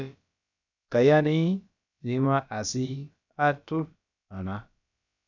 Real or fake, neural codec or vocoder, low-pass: fake; codec, 16 kHz, about 1 kbps, DyCAST, with the encoder's durations; 7.2 kHz